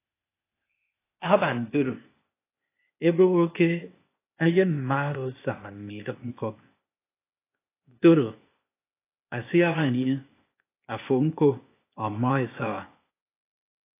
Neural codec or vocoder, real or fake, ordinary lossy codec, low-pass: codec, 16 kHz, 0.8 kbps, ZipCodec; fake; AAC, 24 kbps; 3.6 kHz